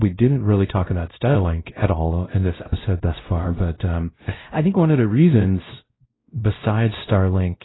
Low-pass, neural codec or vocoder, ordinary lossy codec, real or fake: 7.2 kHz; codec, 16 kHz, 0.5 kbps, X-Codec, WavLM features, trained on Multilingual LibriSpeech; AAC, 16 kbps; fake